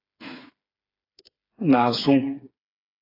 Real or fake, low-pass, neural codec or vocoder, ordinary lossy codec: fake; 5.4 kHz; codec, 16 kHz, 4 kbps, FreqCodec, smaller model; AAC, 32 kbps